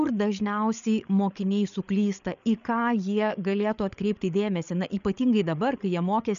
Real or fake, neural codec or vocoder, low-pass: fake; codec, 16 kHz, 8 kbps, FreqCodec, larger model; 7.2 kHz